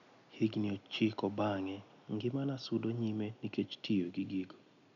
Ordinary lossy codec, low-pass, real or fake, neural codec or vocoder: none; 7.2 kHz; real; none